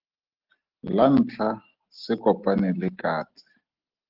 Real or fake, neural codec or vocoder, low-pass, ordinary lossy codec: real; none; 5.4 kHz; Opus, 16 kbps